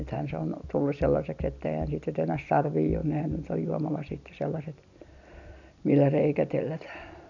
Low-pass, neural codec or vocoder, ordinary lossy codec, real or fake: 7.2 kHz; none; none; real